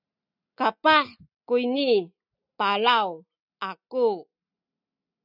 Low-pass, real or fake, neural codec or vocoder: 5.4 kHz; real; none